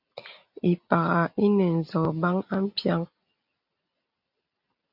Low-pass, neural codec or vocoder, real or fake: 5.4 kHz; none; real